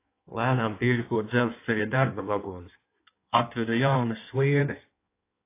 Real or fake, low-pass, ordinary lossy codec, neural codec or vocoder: fake; 3.6 kHz; AAC, 24 kbps; codec, 16 kHz in and 24 kHz out, 1.1 kbps, FireRedTTS-2 codec